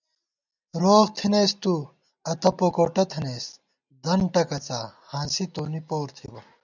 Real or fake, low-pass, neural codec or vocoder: real; 7.2 kHz; none